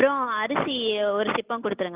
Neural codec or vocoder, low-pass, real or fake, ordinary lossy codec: none; 3.6 kHz; real; Opus, 32 kbps